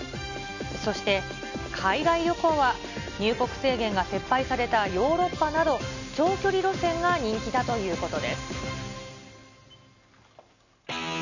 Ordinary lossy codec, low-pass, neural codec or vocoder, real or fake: none; 7.2 kHz; none; real